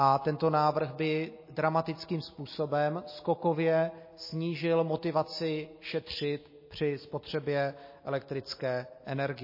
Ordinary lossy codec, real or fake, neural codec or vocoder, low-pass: MP3, 24 kbps; real; none; 5.4 kHz